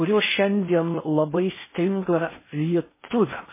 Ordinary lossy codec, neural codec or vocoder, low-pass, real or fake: MP3, 16 kbps; codec, 16 kHz in and 24 kHz out, 0.6 kbps, FocalCodec, streaming, 4096 codes; 3.6 kHz; fake